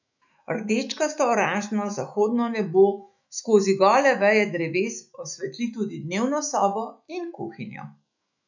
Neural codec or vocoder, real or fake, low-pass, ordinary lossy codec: autoencoder, 48 kHz, 128 numbers a frame, DAC-VAE, trained on Japanese speech; fake; 7.2 kHz; none